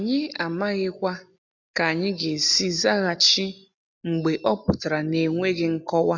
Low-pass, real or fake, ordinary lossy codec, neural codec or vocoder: 7.2 kHz; real; none; none